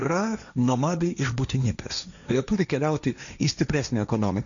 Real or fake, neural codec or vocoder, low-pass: fake; codec, 16 kHz, 1.1 kbps, Voila-Tokenizer; 7.2 kHz